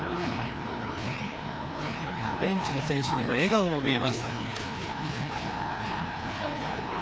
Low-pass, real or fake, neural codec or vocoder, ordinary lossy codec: none; fake; codec, 16 kHz, 2 kbps, FreqCodec, larger model; none